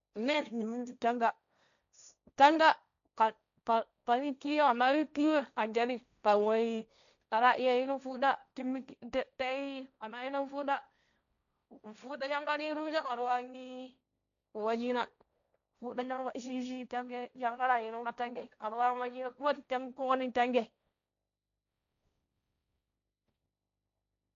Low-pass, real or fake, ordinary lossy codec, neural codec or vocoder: 7.2 kHz; fake; none; codec, 16 kHz, 1.1 kbps, Voila-Tokenizer